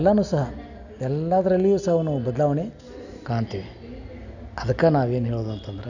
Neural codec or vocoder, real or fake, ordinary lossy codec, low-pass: none; real; none; 7.2 kHz